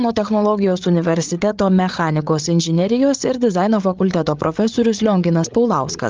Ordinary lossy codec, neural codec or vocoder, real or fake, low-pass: Opus, 32 kbps; codec, 16 kHz, 8 kbps, FreqCodec, larger model; fake; 7.2 kHz